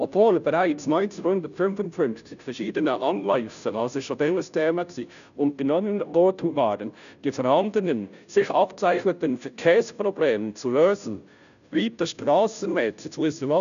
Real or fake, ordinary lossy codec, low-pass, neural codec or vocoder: fake; none; 7.2 kHz; codec, 16 kHz, 0.5 kbps, FunCodec, trained on Chinese and English, 25 frames a second